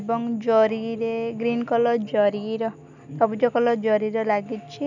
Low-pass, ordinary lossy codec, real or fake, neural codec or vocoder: 7.2 kHz; none; real; none